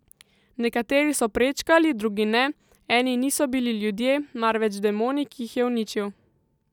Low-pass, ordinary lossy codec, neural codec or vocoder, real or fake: 19.8 kHz; none; none; real